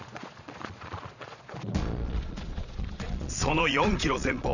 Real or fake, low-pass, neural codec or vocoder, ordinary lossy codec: fake; 7.2 kHz; vocoder, 22.05 kHz, 80 mel bands, Vocos; none